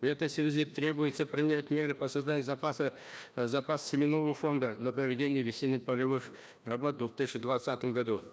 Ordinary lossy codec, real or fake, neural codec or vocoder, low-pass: none; fake; codec, 16 kHz, 1 kbps, FreqCodec, larger model; none